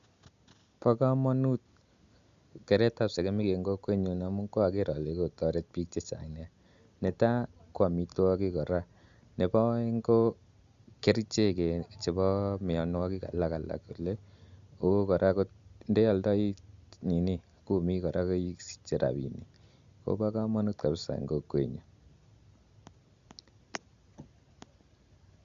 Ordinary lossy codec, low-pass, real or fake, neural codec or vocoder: Opus, 64 kbps; 7.2 kHz; real; none